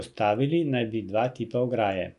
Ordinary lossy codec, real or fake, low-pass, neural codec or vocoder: none; real; 10.8 kHz; none